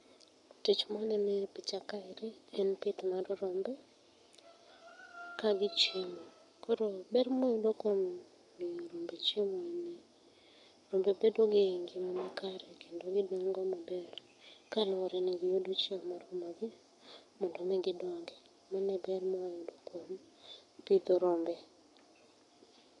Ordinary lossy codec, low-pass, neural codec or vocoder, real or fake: none; 10.8 kHz; codec, 44.1 kHz, 7.8 kbps, Pupu-Codec; fake